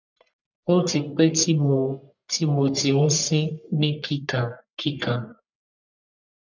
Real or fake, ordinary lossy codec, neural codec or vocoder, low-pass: fake; none; codec, 44.1 kHz, 1.7 kbps, Pupu-Codec; 7.2 kHz